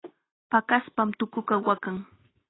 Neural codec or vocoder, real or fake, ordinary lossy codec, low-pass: none; real; AAC, 16 kbps; 7.2 kHz